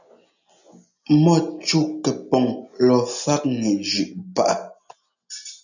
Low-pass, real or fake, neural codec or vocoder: 7.2 kHz; real; none